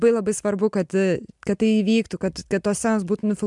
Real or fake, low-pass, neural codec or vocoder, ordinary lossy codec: real; 10.8 kHz; none; MP3, 96 kbps